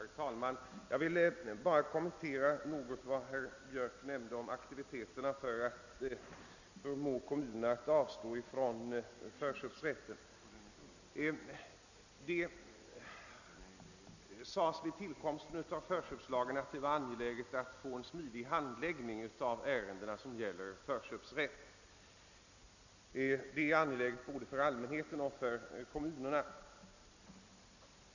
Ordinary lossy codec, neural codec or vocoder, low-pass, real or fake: none; none; 7.2 kHz; real